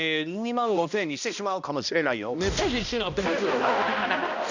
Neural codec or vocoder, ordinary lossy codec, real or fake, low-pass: codec, 16 kHz, 1 kbps, X-Codec, HuBERT features, trained on balanced general audio; none; fake; 7.2 kHz